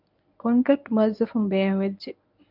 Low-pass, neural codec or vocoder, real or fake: 5.4 kHz; codec, 24 kHz, 0.9 kbps, WavTokenizer, medium speech release version 1; fake